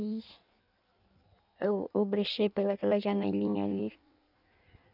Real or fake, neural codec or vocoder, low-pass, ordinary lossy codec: fake; codec, 16 kHz in and 24 kHz out, 1.1 kbps, FireRedTTS-2 codec; 5.4 kHz; none